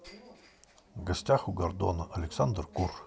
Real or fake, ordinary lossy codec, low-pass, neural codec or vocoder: real; none; none; none